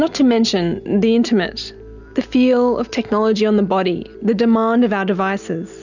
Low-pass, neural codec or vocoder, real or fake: 7.2 kHz; none; real